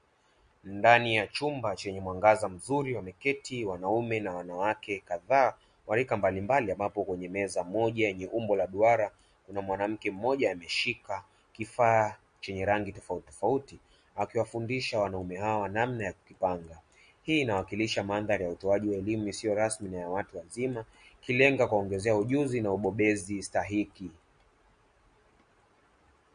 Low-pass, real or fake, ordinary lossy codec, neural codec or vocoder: 9.9 kHz; real; MP3, 48 kbps; none